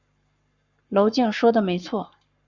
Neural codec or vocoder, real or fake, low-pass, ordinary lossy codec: codec, 44.1 kHz, 7.8 kbps, Pupu-Codec; fake; 7.2 kHz; Opus, 64 kbps